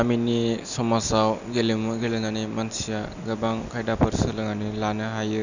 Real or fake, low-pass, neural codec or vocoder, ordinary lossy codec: real; 7.2 kHz; none; none